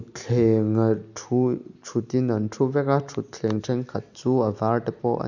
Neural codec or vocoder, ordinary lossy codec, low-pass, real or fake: none; none; 7.2 kHz; real